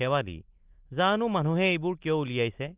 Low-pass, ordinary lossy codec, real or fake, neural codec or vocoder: 3.6 kHz; Opus, 32 kbps; real; none